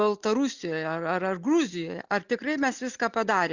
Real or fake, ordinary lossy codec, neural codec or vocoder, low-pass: real; Opus, 64 kbps; none; 7.2 kHz